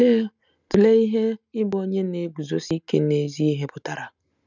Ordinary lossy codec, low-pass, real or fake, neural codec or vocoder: none; 7.2 kHz; real; none